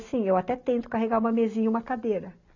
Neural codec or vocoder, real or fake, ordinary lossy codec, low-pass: none; real; none; 7.2 kHz